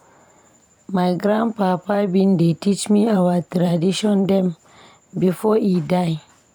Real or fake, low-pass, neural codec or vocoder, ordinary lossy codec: fake; 19.8 kHz; vocoder, 44.1 kHz, 128 mel bands every 512 samples, BigVGAN v2; none